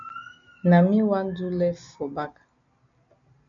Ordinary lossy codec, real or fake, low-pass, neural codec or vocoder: MP3, 96 kbps; real; 7.2 kHz; none